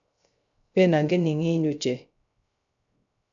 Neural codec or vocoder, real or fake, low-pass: codec, 16 kHz, 0.3 kbps, FocalCodec; fake; 7.2 kHz